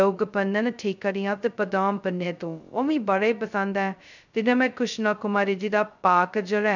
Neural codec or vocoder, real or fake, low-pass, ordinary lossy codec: codec, 16 kHz, 0.2 kbps, FocalCodec; fake; 7.2 kHz; none